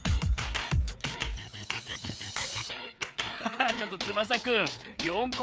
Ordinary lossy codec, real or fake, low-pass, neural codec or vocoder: none; fake; none; codec, 16 kHz, 4 kbps, FreqCodec, larger model